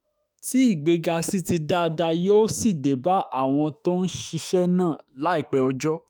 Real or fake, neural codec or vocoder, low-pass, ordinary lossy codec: fake; autoencoder, 48 kHz, 32 numbers a frame, DAC-VAE, trained on Japanese speech; none; none